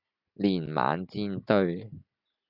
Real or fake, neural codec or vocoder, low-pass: real; none; 5.4 kHz